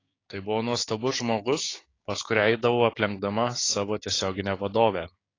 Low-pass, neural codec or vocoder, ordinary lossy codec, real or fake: 7.2 kHz; codec, 16 kHz, 6 kbps, DAC; AAC, 32 kbps; fake